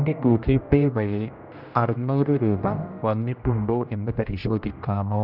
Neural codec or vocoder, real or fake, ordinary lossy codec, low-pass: codec, 16 kHz, 1 kbps, X-Codec, HuBERT features, trained on general audio; fake; none; 5.4 kHz